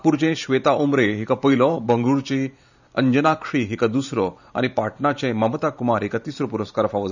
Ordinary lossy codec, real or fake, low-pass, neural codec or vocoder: none; fake; 7.2 kHz; vocoder, 44.1 kHz, 128 mel bands every 512 samples, BigVGAN v2